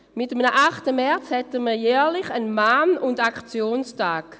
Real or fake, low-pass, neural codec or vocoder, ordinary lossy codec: real; none; none; none